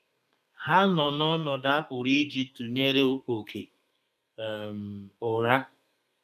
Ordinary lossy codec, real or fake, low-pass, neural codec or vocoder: none; fake; 14.4 kHz; codec, 32 kHz, 1.9 kbps, SNAC